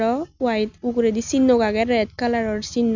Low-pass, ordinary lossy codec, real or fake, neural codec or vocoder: 7.2 kHz; none; real; none